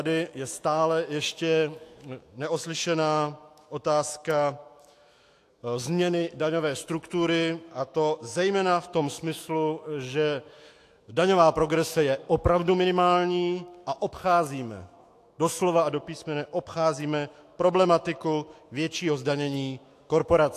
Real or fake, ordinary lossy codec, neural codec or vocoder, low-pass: fake; AAC, 64 kbps; autoencoder, 48 kHz, 128 numbers a frame, DAC-VAE, trained on Japanese speech; 14.4 kHz